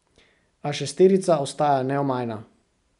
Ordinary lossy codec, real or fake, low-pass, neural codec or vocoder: none; real; 10.8 kHz; none